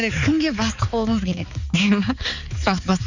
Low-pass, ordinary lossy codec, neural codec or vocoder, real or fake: 7.2 kHz; none; codec, 16 kHz, 4 kbps, X-Codec, HuBERT features, trained on balanced general audio; fake